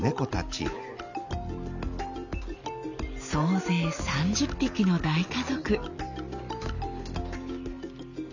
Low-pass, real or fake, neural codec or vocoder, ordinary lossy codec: 7.2 kHz; real; none; none